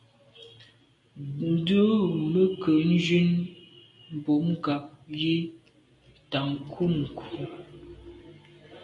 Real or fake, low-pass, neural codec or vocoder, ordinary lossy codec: real; 10.8 kHz; none; MP3, 48 kbps